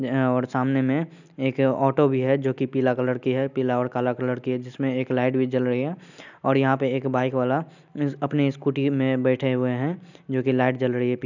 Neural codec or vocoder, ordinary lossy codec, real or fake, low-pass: none; none; real; 7.2 kHz